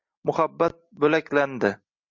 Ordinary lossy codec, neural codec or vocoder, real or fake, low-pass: MP3, 48 kbps; none; real; 7.2 kHz